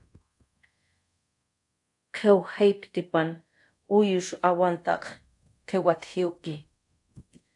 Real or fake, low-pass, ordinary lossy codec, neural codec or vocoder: fake; 10.8 kHz; AAC, 64 kbps; codec, 24 kHz, 0.5 kbps, DualCodec